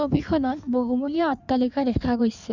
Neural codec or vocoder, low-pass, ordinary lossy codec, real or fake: codec, 16 kHz in and 24 kHz out, 1.1 kbps, FireRedTTS-2 codec; 7.2 kHz; none; fake